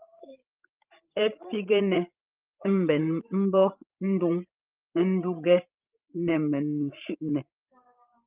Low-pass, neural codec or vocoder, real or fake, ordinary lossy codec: 3.6 kHz; codec, 16 kHz, 16 kbps, FreqCodec, larger model; fake; Opus, 24 kbps